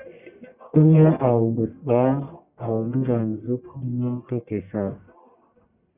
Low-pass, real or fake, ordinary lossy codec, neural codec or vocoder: 3.6 kHz; fake; Opus, 64 kbps; codec, 44.1 kHz, 1.7 kbps, Pupu-Codec